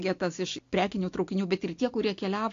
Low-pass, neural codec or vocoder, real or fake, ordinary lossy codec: 7.2 kHz; none; real; AAC, 48 kbps